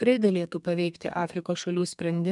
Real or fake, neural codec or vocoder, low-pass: fake; codec, 32 kHz, 1.9 kbps, SNAC; 10.8 kHz